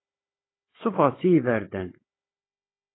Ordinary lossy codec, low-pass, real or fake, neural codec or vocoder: AAC, 16 kbps; 7.2 kHz; fake; codec, 16 kHz, 16 kbps, FunCodec, trained on Chinese and English, 50 frames a second